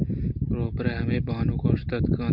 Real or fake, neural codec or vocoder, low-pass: real; none; 5.4 kHz